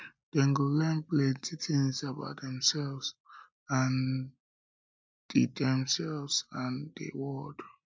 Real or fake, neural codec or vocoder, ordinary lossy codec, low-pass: real; none; none; none